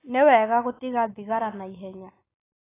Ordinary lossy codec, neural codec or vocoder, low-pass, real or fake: AAC, 16 kbps; none; 3.6 kHz; real